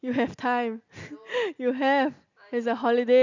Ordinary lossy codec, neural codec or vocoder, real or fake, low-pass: none; none; real; 7.2 kHz